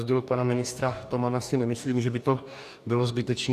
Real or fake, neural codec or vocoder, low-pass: fake; codec, 44.1 kHz, 2.6 kbps, DAC; 14.4 kHz